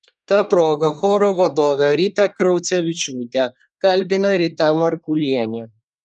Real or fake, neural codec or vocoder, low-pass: fake; codec, 24 kHz, 1 kbps, SNAC; 10.8 kHz